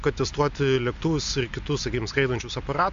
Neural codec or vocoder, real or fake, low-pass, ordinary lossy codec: none; real; 7.2 kHz; MP3, 64 kbps